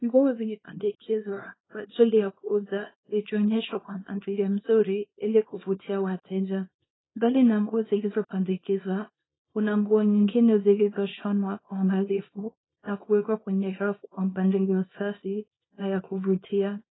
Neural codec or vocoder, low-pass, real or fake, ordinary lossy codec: codec, 24 kHz, 0.9 kbps, WavTokenizer, small release; 7.2 kHz; fake; AAC, 16 kbps